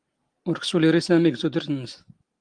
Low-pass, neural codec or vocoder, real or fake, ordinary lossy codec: 9.9 kHz; none; real; Opus, 24 kbps